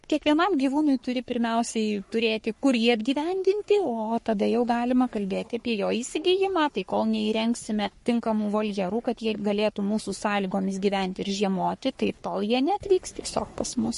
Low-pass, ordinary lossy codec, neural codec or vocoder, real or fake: 14.4 kHz; MP3, 48 kbps; codec, 44.1 kHz, 3.4 kbps, Pupu-Codec; fake